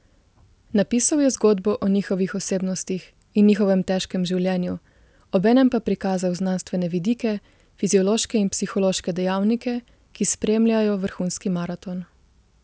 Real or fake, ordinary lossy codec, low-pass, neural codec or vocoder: real; none; none; none